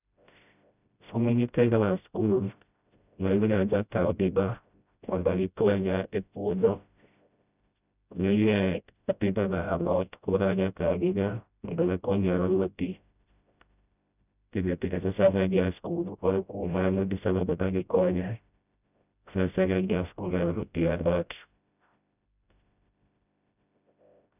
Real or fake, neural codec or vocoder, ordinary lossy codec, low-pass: fake; codec, 16 kHz, 0.5 kbps, FreqCodec, smaller model; none; 3.6 kHz